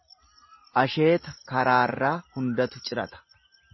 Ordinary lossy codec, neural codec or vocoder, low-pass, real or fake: MP3, 24 kbps; none; 7.2 kHz; real